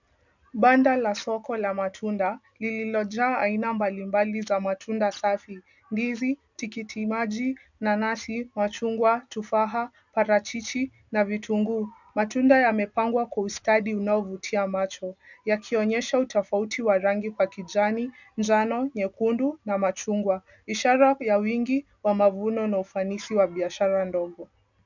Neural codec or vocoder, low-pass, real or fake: none; 7.2 kHz; real